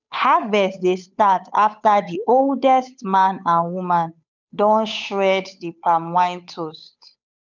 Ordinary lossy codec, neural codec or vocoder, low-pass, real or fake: none; codec, 16 kHz, 8 kbps, FunCodec, trained on Chinese and English, 25 frames a second; 7.2 kHz; fake